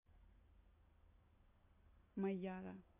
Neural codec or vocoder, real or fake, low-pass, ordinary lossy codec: none; real; 3.6 kHz; MP3, 24 kbps